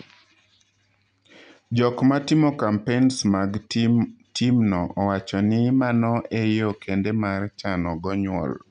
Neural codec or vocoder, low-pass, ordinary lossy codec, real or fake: none; 9.9 kHz; none; real